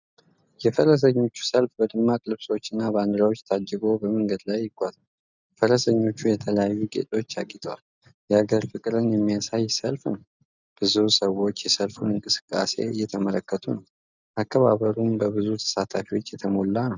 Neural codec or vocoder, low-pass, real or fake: none; 7.2 kHz; real